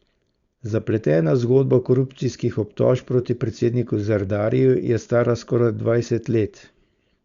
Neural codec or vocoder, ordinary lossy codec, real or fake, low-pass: codec, 16 kHz, 4.8 kbps, FACodec; Opus, 64 kbps; fake; 7.2 kHz